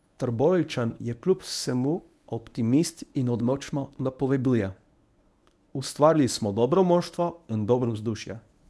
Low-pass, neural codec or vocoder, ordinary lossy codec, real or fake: none; codec, 24 kHz, 0.9 kbps, WavTokenizer, medium speech release version 1; none; fake